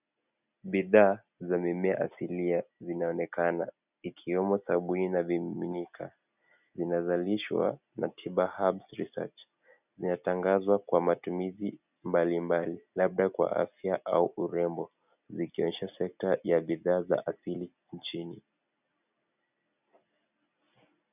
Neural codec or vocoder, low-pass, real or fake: none; 3.6 kHz; real